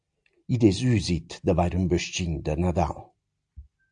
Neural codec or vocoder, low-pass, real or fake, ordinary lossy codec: none; 9.9 kHz; real; AAC, 64 kbps